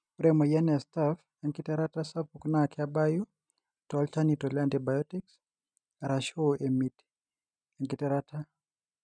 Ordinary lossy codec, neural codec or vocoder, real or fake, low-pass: none; none; real; 9.9 kHz